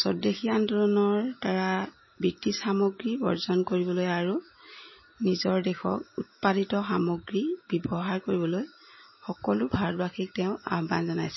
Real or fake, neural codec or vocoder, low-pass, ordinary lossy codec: real; none; 7.2 kHz; MP3, 24 kbps